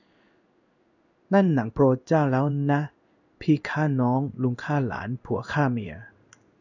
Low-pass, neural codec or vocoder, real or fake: 7.2 kHz; codec, 16 kHz in and 24 kHz out, 1 kbps, XY-Tokenizer; fake